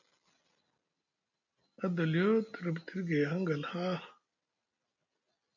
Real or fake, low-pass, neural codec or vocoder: real; 7.2 kHz; none